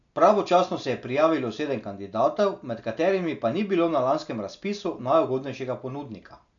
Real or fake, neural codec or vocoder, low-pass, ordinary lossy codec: real; none; 7.2 kHz; none